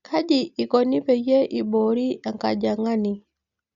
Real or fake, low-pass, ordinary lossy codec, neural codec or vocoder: real; 7.2 kHz; Opus, 64 kbps; none